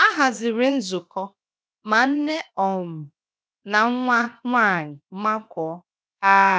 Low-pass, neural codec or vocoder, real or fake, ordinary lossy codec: none; codec, 16 kHz, 0.7 kbps, FocalCodec; fake; none